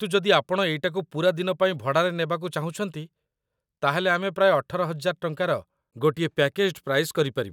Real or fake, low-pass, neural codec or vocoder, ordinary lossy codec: real; 19.8 kHz; none; none